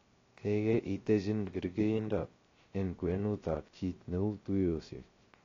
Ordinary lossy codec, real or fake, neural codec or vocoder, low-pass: AAC, 32 kbps; fake; codec, 16 kHz, 0.3 kbps, FocalCodec; 7.2 kHz